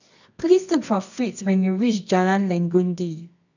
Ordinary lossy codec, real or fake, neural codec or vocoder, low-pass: none; fake; codec, 24 kHz, 0.9 kbps, WavTokenizer, medium music audio release; 7.2 kHz